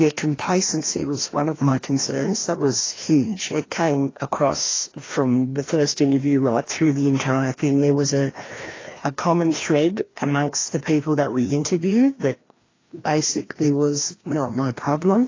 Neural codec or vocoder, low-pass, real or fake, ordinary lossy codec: codec, 16 kHz, 1 kbps, FreqCodec, larger model; 7.2 kHz; fake; AAC, 32 kbps